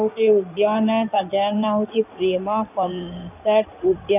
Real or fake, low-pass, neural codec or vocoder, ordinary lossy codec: fake; 3.6 kHz; codec, 16 kHz in and 24 kHz out, 2.2 kbps, FireRedTTS-2 codec; none